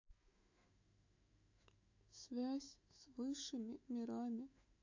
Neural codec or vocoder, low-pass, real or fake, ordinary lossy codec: autoencoder, 48 kHz, 128 numbers a frame, DAC-VAE, trained on Japanese speech; 7.2 kHz; fake; AAC, 48 kbps